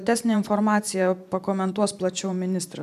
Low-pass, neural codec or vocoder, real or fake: 14.4 kHz; vocoder, 44.1 kHz, 128 mel bands every 512 samples, BigVGAN v2; fake